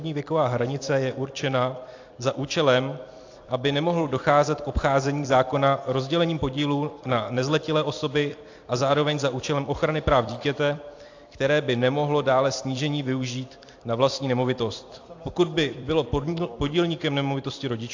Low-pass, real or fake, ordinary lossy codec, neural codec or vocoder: 7.2 kHz; fake; AAC, 48 kbps; vocoder, 44.1 kHz, 128 mel bands every 256 samples, BigVGAN v2